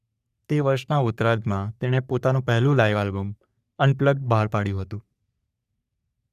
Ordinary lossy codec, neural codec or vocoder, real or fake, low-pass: none; codec, 44.1 kHz, 3.4 kbps, Pupu-Codec; fake; 14.4 kHz